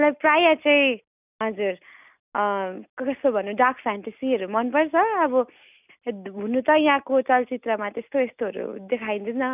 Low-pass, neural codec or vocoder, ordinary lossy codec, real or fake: 3.6 kHz; none; none; real